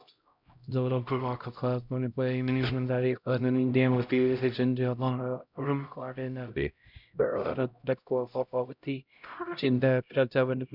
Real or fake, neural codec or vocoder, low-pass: fake; codec, 16 kHz, 0.5 kbps, X-Codec, HuBERT features, trained on LibriSpeech; 5.4 kHz